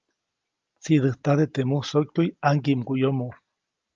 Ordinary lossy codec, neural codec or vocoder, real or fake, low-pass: Opus, 24 kbps; none; real; 7.2 kHz